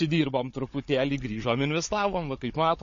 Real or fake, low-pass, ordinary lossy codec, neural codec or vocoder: fake; 7.2 kHz; MP3, 32 kbps; codec, 16 kHz, 16 kbps, FunCodec, trained on Chinese and English, 50 frames a second